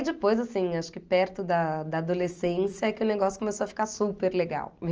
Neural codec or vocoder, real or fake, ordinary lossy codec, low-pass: none; real; Opus, 24 kbps; 7.2 kHz